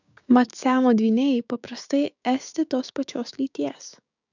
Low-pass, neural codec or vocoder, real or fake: 7.2 kHz; codec, 16 kHz, 6 kbps, DAC; fake